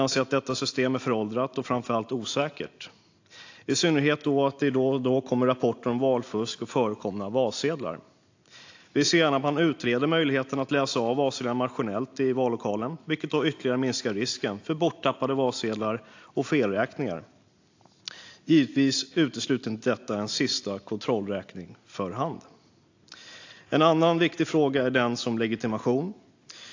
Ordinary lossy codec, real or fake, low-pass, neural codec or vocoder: AAC, 48 kbps; real; 7.2 kHz; none